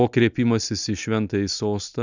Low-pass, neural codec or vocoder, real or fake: 7.2 kHz; none; real